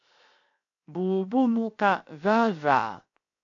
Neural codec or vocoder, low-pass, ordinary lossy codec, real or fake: codec, 16 kHz, 0.7 kbps, FocalCodec; 7.2 kHz; AAC, 48 kbps; fake